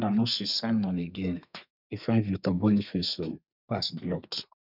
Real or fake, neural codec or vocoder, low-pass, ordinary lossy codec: fake; codec, 32 kHz, 1.9 kbps, SNAC; 5.4 kHz; none